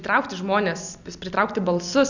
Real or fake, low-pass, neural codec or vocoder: real; 7.2 kHz; none